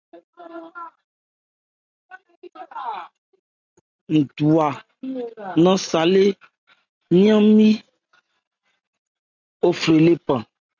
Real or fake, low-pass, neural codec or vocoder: real; 7.2 kHz; none